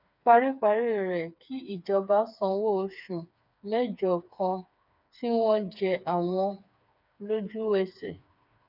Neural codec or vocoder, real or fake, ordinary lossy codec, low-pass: codec, 16 kHz, 4 kbps, FreqCodec, smaller model; fake; none; 5.4 kHz